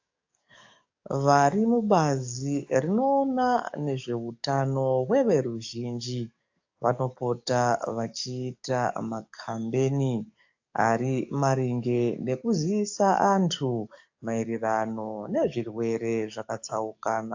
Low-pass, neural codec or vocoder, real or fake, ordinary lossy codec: 7.2 kHz; codec, 44.1 kHz, 7.8 kbps, DAC; fake; AAC, 48 kbps